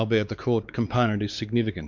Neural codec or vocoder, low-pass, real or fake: codec, 16 kHz, 4 kbps, X-Codec, WavLM features, trained on Multilingual LibriSpeech; 7.2 kHz; fake